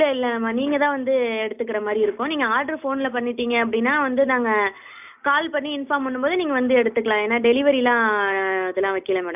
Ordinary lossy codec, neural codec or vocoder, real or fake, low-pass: none; none; real; 3.6 kHz